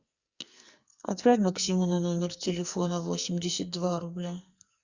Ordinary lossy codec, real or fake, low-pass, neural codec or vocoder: Opus, 64 kbps; fake; 7.2 kHz; codec, 44.1 kHz, 2.6 kbps, SNAC